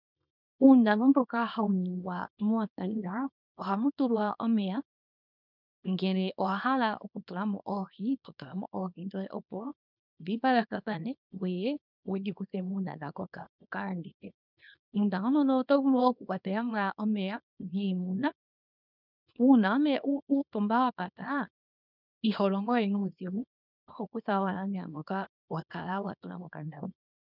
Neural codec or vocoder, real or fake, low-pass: codec, 24 kHz, 0.9 kbps, WavTokenizer, small release; fake; 5.4 kHz